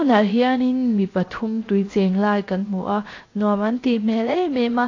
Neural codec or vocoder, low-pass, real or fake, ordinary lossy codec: codec, 16 kHz, 0.7 kbps, FocalCodec; 7.2 kHz; fake; AAC, 32 kbps